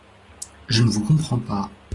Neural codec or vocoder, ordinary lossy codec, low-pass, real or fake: none; AAC, 32 kbps; 10.8 kHz; real